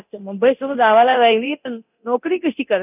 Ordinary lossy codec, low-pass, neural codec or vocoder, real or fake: none; 3.6 kHz; codec, 24 kHz, 0.9 kbps, DualCodec; fake